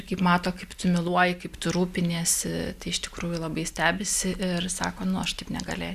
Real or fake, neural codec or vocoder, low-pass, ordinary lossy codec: real; none; 14.4 kHz; Opus, 64 kbps